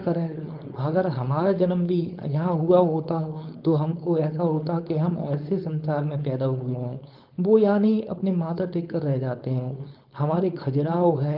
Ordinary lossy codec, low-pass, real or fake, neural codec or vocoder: Opus, 24 kbps; 5.4 kHz; fake; codec, 16 kHz, 4.8 kbps, FACodec